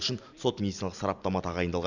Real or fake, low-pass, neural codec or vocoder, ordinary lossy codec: real; 7.2 kHz; none; none